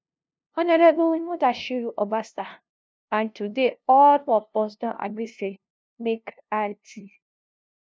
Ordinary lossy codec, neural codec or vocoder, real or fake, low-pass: none; codec, 16 kHz, 0.5 kbps, FunCodec, trained on LibriTTS, 25 frames a second; fake; none